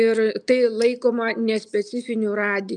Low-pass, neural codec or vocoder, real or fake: 10.8 kHz; none; real